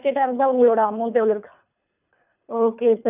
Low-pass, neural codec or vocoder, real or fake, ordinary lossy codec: 3.6 kHz; codec, 24 kHz, 3 kbps, HILCodec; fake; none